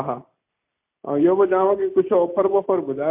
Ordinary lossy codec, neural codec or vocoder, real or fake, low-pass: MP3, 32 kbps; none; real; 3.6 kHz